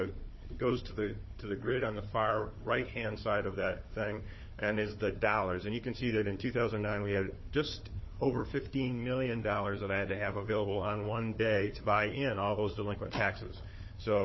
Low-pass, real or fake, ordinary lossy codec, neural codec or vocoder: 7.2 kHz; fake; MP3, 24 kbps; codec, 16 kHz, 4 kbps, FunCodec, trained on Chinese and English, 50 frames a second